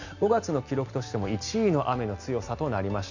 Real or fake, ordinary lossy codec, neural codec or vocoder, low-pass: real; none; none; 7.2 kHz